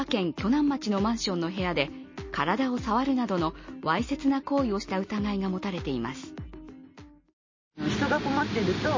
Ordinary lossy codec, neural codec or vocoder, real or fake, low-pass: MP3, 32 kbps; none; real; 7.2 kHz